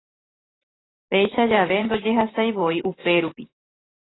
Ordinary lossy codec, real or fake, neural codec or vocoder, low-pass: AAC, 16 kbps; fake; vocoder, 44.1 kHz, 128 mel bands every 512 samples, BigVGAN v2; 7.2 kHz